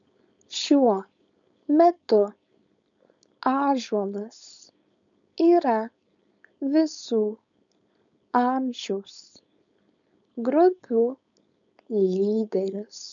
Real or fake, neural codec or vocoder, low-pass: fake; codec, 16 kHz, 4.8 kbps, FACodec; 7.2 kHz